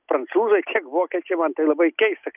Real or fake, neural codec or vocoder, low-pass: real; none; 3.6 kHz